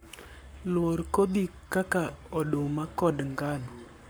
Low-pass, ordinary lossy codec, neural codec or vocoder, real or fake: none; none; codec, 44.1 kHz, 7.8 kbps, Pupu-Codec; fake